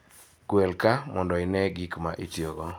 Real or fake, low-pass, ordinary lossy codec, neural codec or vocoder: real; none; none; none